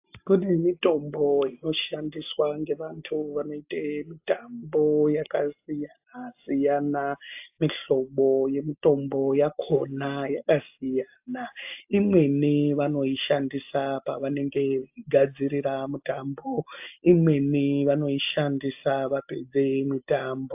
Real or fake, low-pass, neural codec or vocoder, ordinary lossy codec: real; 3.6 kHz; none; AAC, 32 kbps